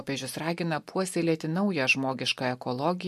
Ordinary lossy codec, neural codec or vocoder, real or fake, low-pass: MP3, 64 kbps; none; real; 14.4 kHz